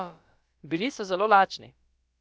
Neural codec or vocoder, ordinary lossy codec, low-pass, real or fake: codec, 16 kHz, about 1 kbps, DyCAST, with the encoder's durations; none; none; fake